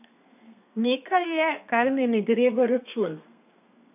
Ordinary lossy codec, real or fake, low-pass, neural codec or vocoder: none; fake; 3.6 kHz; codec, 32 kHz, 1.9 kbps, SNAC